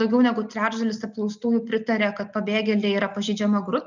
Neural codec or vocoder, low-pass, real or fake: none; 7.2 kHz; real